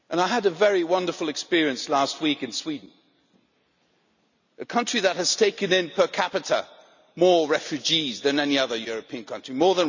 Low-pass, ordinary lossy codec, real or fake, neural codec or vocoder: 7.2 kHz; none; real; none